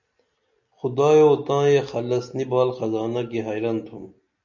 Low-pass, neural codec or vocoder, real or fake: 7.2 kHz; none; real